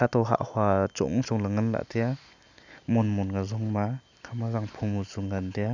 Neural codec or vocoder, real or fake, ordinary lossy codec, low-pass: none; real; none; 7.2 kHz